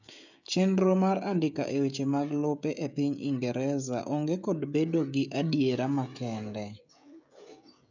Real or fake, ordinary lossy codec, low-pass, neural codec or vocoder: fake; none; 7.2 kHz; codec, 16 kHz, 16 kbps, FreqCodec, smaller model